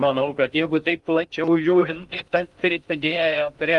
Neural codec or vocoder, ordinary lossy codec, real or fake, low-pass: codec, 16 kHz in and 24 kHz out, 0.6 kbps, FocalCodec, streaming, 2048 codes; Opus, 32 kbps; fake; 10.8 kHz